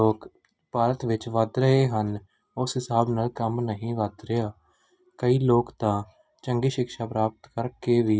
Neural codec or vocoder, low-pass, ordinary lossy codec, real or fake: none; none; none; real